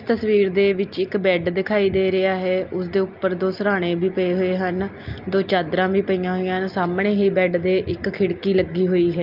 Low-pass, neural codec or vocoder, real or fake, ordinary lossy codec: 5.4 kHz; none; real; Opus, 24 kbps